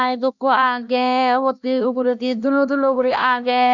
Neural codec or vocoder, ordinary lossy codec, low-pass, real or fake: codec, 16 kHz, 1 kbps, X-Codec, HuBERT features, trained on LibriSpeech; none; 7.2 kHz; fake